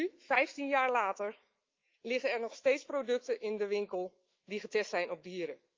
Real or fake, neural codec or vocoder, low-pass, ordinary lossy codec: fake; autoencoder, 48 kHz, 128 numbers a frame, DAC-VAE, trained on Japanese speech; 7.2 kHz; Opus, 24 kbps